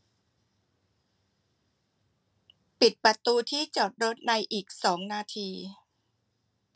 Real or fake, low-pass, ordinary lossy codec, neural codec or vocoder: real; none; none; none